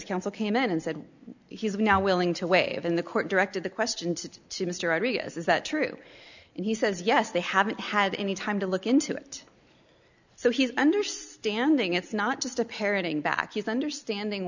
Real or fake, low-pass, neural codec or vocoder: real; 7.2 kHz; none